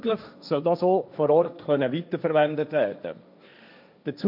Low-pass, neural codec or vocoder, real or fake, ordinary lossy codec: 5.4 kHz; codec, 16 kHz, 1.1 kbps, Voila-Tokenizer; fake; none